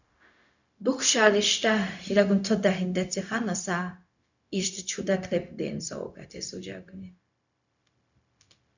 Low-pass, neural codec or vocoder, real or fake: 7.2 kHz; codec, 16 kHz, 0.4 kbps, LongCat-Audio-Codec; fake